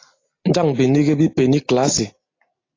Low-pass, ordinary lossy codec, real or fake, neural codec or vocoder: 7.2 kHz; AAC, 32 kbps; real; none